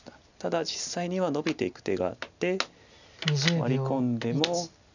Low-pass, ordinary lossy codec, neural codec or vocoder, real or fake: 7.2 kHz; none; none; real